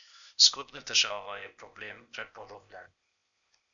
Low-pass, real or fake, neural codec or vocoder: 7.2 kHz; fake; codec, 16 kHz, 0.8 kbps, ZipCodec